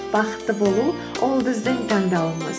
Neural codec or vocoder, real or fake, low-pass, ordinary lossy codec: none; real; none; none